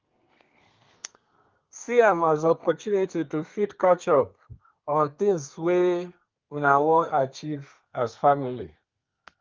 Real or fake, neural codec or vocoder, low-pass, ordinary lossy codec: fake; codec, 32 kHz, 1.9 kbps, SNAC; 7.2 kHz; Opus, 24 kbps